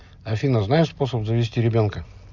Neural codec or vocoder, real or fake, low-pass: none; real; 7.2 kHz